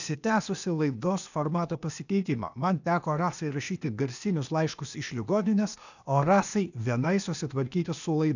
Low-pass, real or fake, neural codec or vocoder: 7.2 kHz; fake; codec, 16 kHz, 0.8 kbps, ZipCodec